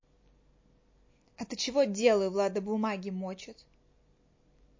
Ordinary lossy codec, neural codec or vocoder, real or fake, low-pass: MP3, 32 kbps; none; real; 7.2 kHz